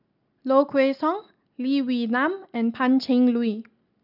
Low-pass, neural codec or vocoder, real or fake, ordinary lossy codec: 5.4 kHz; none; real; none